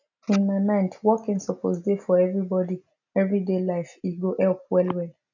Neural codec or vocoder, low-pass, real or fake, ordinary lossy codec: none; 7.2 kHz; real; none